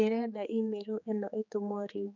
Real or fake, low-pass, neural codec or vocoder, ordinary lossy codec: fake; 7.2 kHz; codec, 16 kHz, 4 kbps, X-Codec, HuBERT features, trained on general audio; none